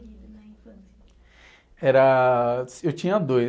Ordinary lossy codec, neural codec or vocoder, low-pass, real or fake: none; none; none; real